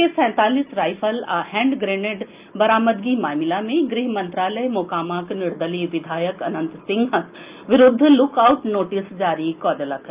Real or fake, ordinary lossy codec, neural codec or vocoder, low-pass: real; Opus, 24 kbps; none; 3.6 kHz